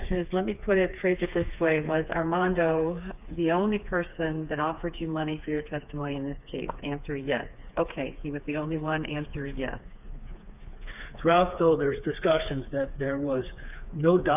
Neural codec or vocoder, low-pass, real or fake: codec, 16 kHz, 4 kbps, FreqCodec, smaller model; 3.6 kHz; fake